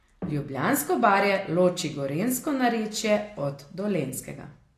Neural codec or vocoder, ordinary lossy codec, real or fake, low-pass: none; AAC, 48 kbps; real; 14.4 kHz